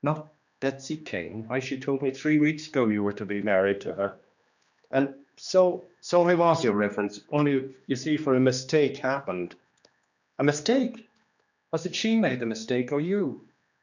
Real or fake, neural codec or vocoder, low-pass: fake; codec, 16 kHz, 2 kbps, X-Codec, HuBERT features, trained on general audio; 7.2 kHz